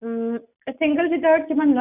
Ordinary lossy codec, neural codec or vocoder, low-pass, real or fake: none; none; 3.6 kHz; real